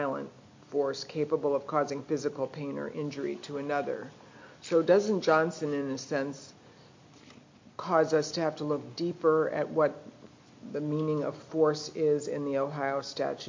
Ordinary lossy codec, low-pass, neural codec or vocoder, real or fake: MP3, 48 kbps; 7.2 kHz; none; real